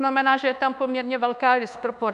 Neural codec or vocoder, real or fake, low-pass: codec, 24 kHz, 1.2 kbps, DualCodec; fake; 10.8 kHz